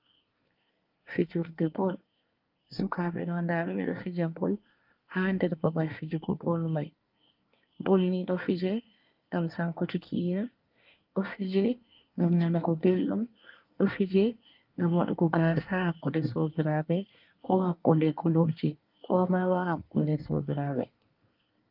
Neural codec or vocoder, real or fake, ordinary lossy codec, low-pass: codec, 24 kHz, 1 kbps, SNAC; fake; Opus, 32 kbps; 5.4 kHz